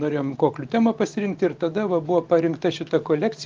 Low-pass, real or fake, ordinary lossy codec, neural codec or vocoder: 7.2 kHz; real; Opus, 24 kbps; none